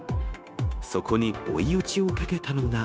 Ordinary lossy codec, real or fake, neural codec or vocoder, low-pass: none; fake; codec, 16 kHz, 0.9 kbps, LongCat-Audio-Codec; none